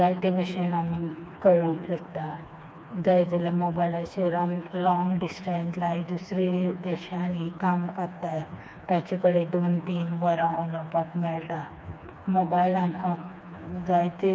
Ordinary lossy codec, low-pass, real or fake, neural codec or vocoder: none; none; fake; codec, 16 kHz, 2 kbps, FreqCodec, smaller model